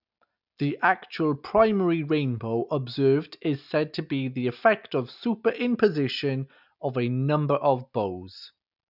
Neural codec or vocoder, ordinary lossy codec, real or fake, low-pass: none; none; real; 5.4 kHz